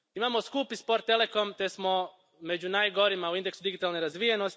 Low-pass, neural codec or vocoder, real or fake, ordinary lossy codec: none; none; real; none